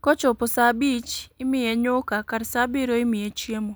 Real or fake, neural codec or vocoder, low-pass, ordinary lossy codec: real; none; none; none